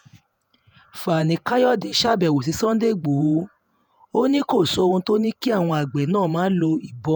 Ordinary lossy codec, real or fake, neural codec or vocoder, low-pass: none; fake; vocoder, 48 kHz, 128 mel bands, Vocos; none